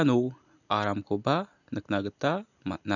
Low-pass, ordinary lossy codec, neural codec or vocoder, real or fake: 7.2 kHz; none; none; real